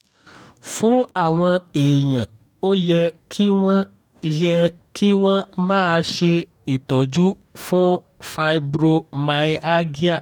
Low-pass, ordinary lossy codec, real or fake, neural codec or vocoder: 19.8 kHz; none; fake; codec, 44.1 kHz, 2.6 kbps, DAC